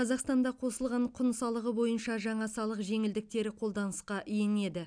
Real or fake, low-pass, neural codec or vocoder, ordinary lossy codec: real; 9.9 kHz; none; none